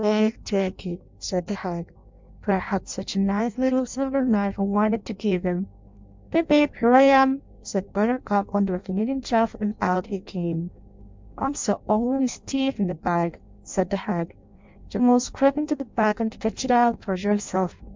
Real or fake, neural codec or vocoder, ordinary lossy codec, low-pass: fake; codec, 16 kHz in and 24 kHz out, 0.6 kbps, FireRedTTS-2 codec; MP3, 64 kbps; 7.2 kHz